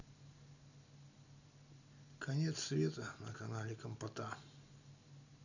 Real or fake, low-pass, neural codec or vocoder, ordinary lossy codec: real; 7.2 kHz; none; none